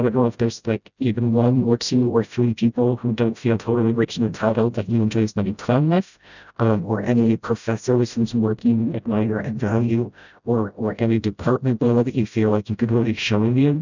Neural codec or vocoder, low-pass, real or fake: codec, 16 kHz, 0.5 kbps, FreqCodec, smaller model; 7.2 kHz; fake